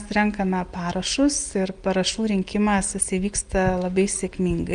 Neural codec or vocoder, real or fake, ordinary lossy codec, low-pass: none; real; Opus, 32 kbps; 9.9 kHz